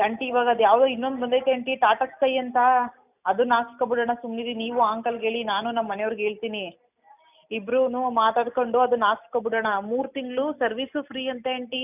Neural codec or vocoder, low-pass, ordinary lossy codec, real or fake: none; 3.6 kHz; none; real